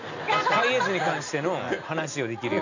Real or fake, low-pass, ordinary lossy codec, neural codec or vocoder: real; 7.2 kHz; none; none